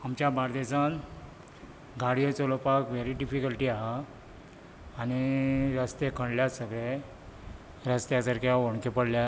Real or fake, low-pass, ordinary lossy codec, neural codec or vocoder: real; none; none; none